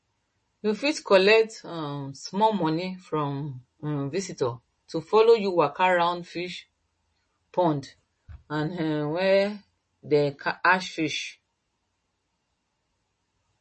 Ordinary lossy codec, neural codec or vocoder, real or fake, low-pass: MP3, 32 kbps; none; real; 10.8 kHz